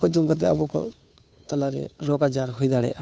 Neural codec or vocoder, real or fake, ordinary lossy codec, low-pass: codec, 16 kHz, 2 kbps, FunCodec, trained on Chinese and English, 25 frames a second; fake; none; none